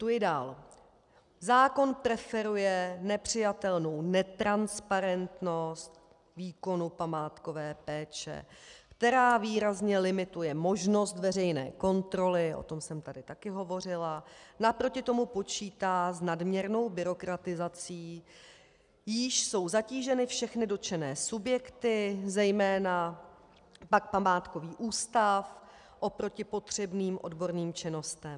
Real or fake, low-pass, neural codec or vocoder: real; 10.8 kHz; none